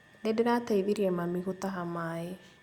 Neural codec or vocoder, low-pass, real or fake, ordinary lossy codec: none; 19.8 kHz; real; Opus, 64 kbps